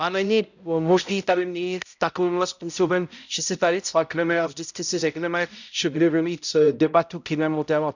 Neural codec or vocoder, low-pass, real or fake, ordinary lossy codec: codec, 16 kHz, 0.5 kbps, X-Codec, HuBERT features, trained on balanced general audio; 7.2 kHz; fake; none